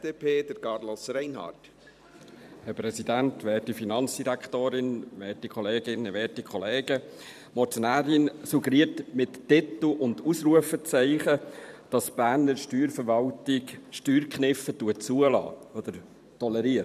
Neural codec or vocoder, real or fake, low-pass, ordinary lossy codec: vocoder, 44.1 kHz, 128 mel bands every 512 samples, BigVGAN v2; fake; 14.4 kHz; none